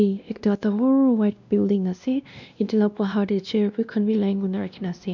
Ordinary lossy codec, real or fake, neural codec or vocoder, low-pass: none; fake; codec, 16 kHz, 1 kbps, X-Codec, WavLM features, trained on Multilingual LibriSpeech; 7.2 kHz